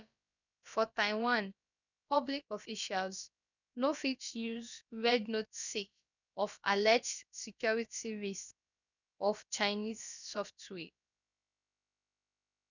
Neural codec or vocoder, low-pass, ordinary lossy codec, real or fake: codec, 16 kHz, about 1 kbps, DyCAST, with the encoder's durations; 7.2 kHz; none; fake